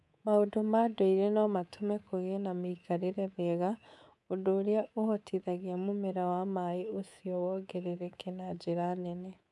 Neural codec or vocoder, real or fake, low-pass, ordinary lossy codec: codec, 24 kHz, 3.1 kbps, DualCodec; fake; none; none